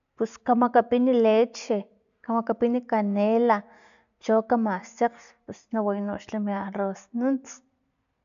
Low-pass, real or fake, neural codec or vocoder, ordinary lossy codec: 7.2 kHz; real; none; MP3, 96 kbps